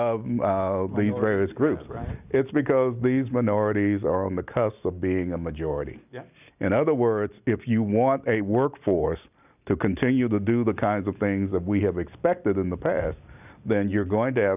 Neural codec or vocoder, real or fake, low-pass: none; real; 3.6 kHz